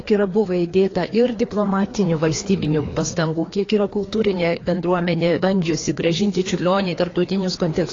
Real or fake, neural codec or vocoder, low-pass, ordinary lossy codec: fake; codec, 16 kHz, 2 kbps, FreqCodec, larger model; 7.2 kHz; AAC, 32 kbps